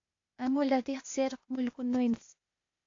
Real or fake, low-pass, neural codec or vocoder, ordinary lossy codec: fake; 7.2 kHz; codec, 16 kHz, 0.8 kbps, ZipCodec; AAC, 48 kbps